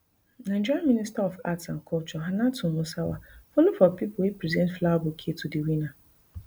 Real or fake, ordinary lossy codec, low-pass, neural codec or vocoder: real; none; none; none